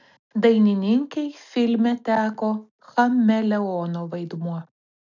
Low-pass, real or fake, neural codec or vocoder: 7.2 kHz; real; none